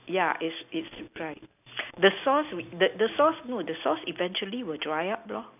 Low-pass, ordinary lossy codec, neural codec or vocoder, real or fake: 3.6 kHz; none; none; real